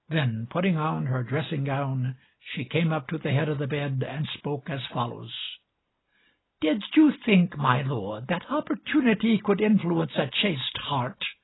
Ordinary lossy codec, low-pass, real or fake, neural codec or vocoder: AAC, 16 kbps; 7.2 kHz; real; none